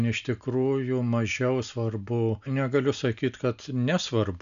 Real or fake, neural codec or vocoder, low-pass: real; none; 7.2 kHz